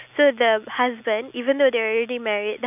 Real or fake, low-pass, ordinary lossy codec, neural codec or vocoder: real; 3.6 kHz; none; none